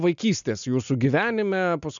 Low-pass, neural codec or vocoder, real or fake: 7.2 kHz; none; real